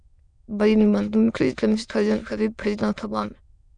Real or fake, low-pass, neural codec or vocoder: fake; 9.9 kHz; autoencoder, 22.05 kHz, a latent of 192 numbers a frame, VITS, trained on many speakers